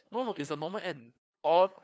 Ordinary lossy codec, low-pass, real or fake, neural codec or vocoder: none; none; fake; codec, 16 kHz, 2 kbps, FunCodec, trained on LibriTTS, 25 frames a second